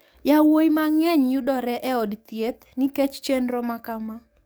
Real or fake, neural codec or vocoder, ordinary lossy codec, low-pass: fake; codec, 44.1 kHz, 7.8 kbps, DAC; none; none